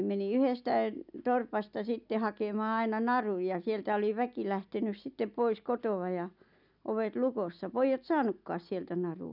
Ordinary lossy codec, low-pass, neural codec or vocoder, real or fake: none; 5.4 kHz; none; real